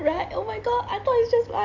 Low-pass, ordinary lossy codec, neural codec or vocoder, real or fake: 7.2 kHz; AAC, 48 kbps; none; real